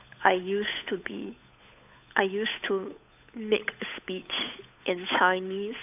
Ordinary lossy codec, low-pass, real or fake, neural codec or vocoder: AAC, 32 kbps; 3.6 kHz; fake; codec, 16 kHz, 8 kbps, FunCodec, trained on Chinese and English, 25 frames a second